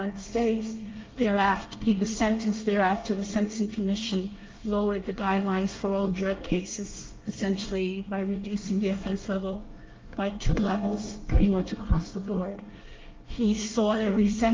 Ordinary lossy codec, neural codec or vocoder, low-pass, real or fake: Opus, 24 kbps; codec, 24 kHz, 1 kbps, SNAC; 7.2 kHz; fake